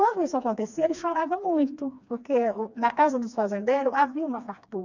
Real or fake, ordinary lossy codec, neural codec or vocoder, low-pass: fake; none; codec, 16 kHz, 2 kbps, FreqCodec, smaller model; 7.2 kHz